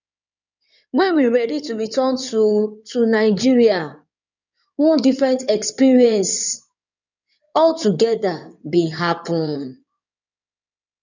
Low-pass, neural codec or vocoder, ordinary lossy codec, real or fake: 7.2 kHz; codec, 16 kHz in and 24 kHz out, 2.2 kbps, FireRedTTS-2 codec; none; fake